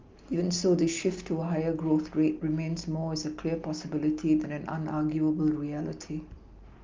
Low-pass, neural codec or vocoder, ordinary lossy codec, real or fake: 7.2 kHz; none; Opus, 32 kbps; real